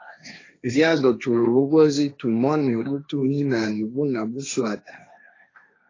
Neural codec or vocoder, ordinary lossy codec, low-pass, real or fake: codec, 16 kHz, 1.1 kbps, Voila-Tokenizer; AAC, 32 kbps; 7.2 kHz; fake